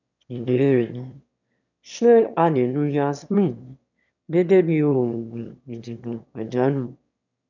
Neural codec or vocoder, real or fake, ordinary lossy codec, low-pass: autoencoder, 22.05 kHz, a latent of 192 numbers a frame, VITS, trained on one speaker; fake; none; 7.2 kHz